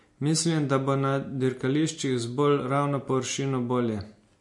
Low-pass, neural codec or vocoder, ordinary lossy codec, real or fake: 10.8 kHz; none; MP3, 48 kbps; real